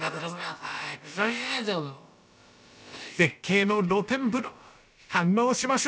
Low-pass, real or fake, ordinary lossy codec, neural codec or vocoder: none; fake; none; codec, 16 kHz, about 1 kbps, DyCAST, with the encoder's durations